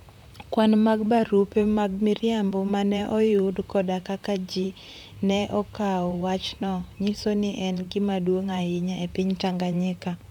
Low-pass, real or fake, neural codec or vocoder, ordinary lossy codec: 19.8 kHz; fake; vocoder, 44.1 kHz, 128 mel bands, Pupu-Vocoder; none